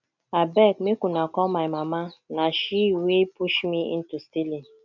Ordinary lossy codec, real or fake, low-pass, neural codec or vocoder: none; real; 7.2 kHz; none